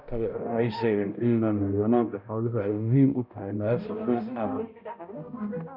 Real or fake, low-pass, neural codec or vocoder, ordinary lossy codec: fake; 5.4 kHz; codec, 16 kHz, 0.5 kbps, X-Codec, HuBERT features, trained on balanced general audio; none